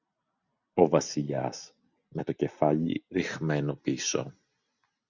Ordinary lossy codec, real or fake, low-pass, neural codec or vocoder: Opus, 64 kbps; real; 7.2 kHz; none